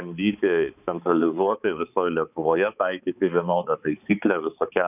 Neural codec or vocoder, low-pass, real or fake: codec, 16 kHz, 4 kbps, X-Codec, HuBERT features, trained on balanced general audio; 3.6 kHz; fake